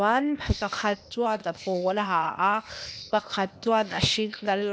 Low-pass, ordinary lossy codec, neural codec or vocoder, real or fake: none; none; codec, 16 kHz, 0.8 kbps, ZipCodec; fake